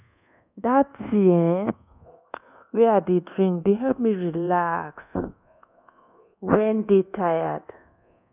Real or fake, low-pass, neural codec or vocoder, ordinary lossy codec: fake; 3.6 kHz; codec, 24 kHz, 1.2 kbps, DualCodec; none